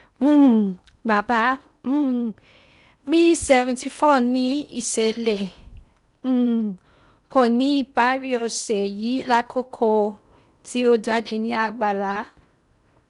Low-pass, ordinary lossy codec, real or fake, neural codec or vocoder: 10.8 kHz; none; fake; codec, 16 kHz in and 24 kHz out, 0.8 kbps, FocalCodec, streaming, 65536 codes